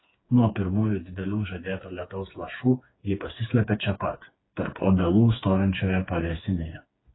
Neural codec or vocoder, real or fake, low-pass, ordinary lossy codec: codec, 16 kHz, 4 kbps, FreqCodec, smaller model; fake; 7.2 kHz; AAC, 16 kbps